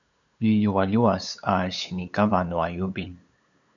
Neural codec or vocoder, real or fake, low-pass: codec, 16 kHz, 8 kbps, FunCodec, trained on LibriTTS, 25 frames a second; fake; 7.2 kHz